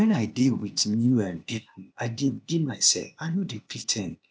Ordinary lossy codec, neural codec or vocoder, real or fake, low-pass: none; codec, 16 kHz, 0.8 kbps, ZipCodec; fake; none